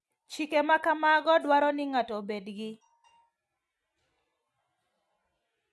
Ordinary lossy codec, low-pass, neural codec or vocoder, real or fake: none; none; none; real